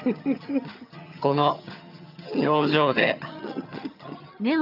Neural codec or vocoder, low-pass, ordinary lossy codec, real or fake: vocoder, 22.05 kHz, 80 mel bands, HiFi-GAN; 5.4 kHz; none; fake